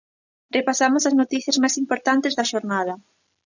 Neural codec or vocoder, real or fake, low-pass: none; real; 7.2 kHz